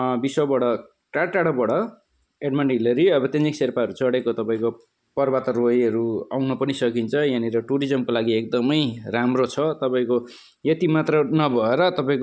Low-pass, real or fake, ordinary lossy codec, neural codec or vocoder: none; real; none; none